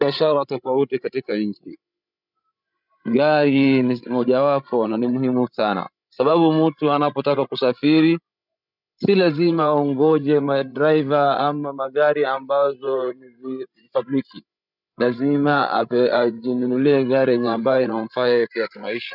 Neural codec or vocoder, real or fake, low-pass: codec, 16 kHz, 16 kbps, FreqCodec, larger model; fake; 5.4 kHz